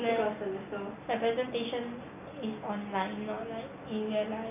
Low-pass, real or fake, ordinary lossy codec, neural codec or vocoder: 3.6 kHz; real; none; none